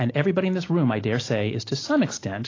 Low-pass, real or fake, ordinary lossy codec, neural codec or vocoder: 7.2 kHz; real; AAC, 32 kbps; none